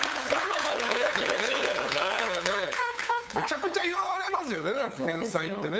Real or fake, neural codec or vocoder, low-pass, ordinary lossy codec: fake; codec, 16 kHz, 8 kbps, FunCodec, trained on LibriTTS, 25 frames a second; none; none